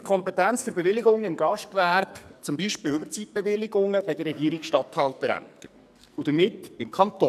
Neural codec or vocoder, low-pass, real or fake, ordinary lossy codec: codec, 44.1 kHz, 2.6 kbps, SNAC; 14.4 kHz; fake; none